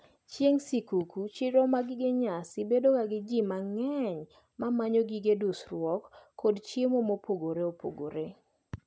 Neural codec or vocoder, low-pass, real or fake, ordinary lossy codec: none; none; real; none